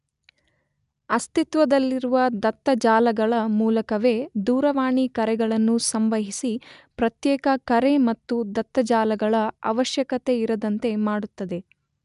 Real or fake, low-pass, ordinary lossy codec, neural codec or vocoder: real; 10.8 kHz; none; none